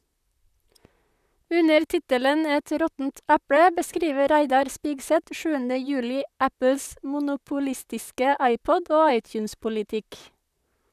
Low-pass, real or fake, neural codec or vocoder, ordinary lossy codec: 14.4 kHz; fake; vocoder, 44.1 kHz, 128 mel bands, Pupu-Vocoder; none